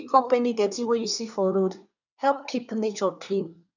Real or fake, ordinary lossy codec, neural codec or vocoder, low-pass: fake; none; codec, 24 kHz, 1 kbps, SNAC; 7.2 kHz